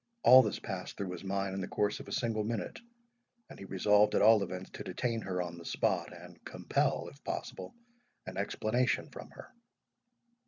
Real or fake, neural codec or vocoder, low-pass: real; none; 7.2 kHz